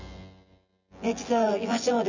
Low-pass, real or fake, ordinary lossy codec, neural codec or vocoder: 7.2 kHz; fake; Opus, 64 kbps; vocoder, 24 kHz, 100 mel bands, Vocos